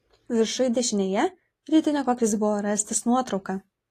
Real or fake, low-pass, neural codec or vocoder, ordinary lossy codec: real; 14.4 kHz; none; AAC, 48 kbps